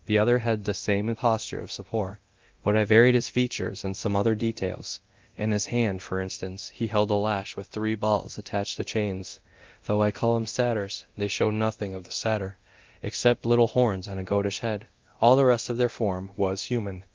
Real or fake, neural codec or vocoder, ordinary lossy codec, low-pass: fake; codec, 24 kHz, 0.9 kbps, DualCodec; Opus, 32 kbps; 7.2 kHz